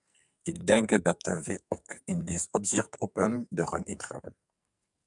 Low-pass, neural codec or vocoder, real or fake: 10.8 kHz; codec, 44.1 kHz, 2.6 kbps, SNAC; fake